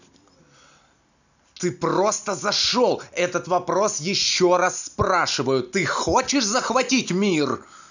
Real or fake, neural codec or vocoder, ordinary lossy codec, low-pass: real; none; none; 7.2 kHz